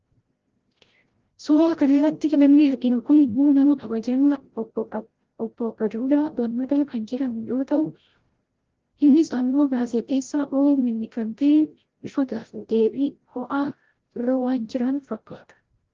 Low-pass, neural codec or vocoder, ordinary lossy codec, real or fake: 7.2 kHz; codec, 16 kHz, 0.5 kbps, FreqCodec, larger model; Opus, 16 kbps; fake